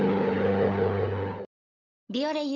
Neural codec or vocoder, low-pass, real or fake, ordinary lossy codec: codec, 16 kHz, 16 kbps, FunCodec, trained on LibriTTS, 50 frames a second; 7.2 kHz; fake; none